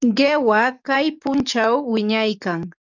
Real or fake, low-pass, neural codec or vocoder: fake; 7.2 kHz; codec, 44.1 kHz, 7.8 kbps, DAC